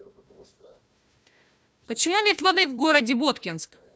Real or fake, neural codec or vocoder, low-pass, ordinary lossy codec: fake; codec, 16 kHz, 1 kbps, FunCodec, trained on Chinese and English, 50 frames a second; none; none